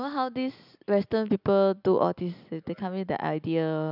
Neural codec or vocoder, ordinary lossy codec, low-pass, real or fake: none; none; 5.4 kHz; real